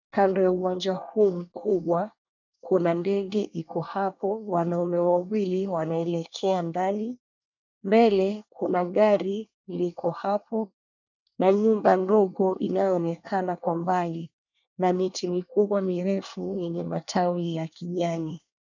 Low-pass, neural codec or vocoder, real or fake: 7.2 kHz; codec, 24 kHz, 1 kbps, SNAC; fake